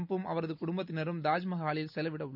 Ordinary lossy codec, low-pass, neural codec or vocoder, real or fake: none; 5.4 kHz; none; real